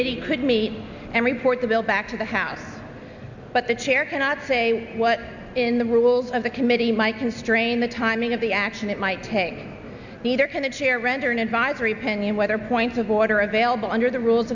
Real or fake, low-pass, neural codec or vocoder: real; 7.2 kHz; none